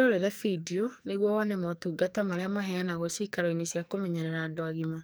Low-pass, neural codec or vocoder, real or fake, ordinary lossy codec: none; codec, 44.1 kHz, 2.6 kbps, SNAC; fake; none